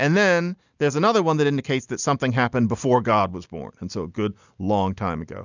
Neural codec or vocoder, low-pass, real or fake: none; 7.2 kHz; real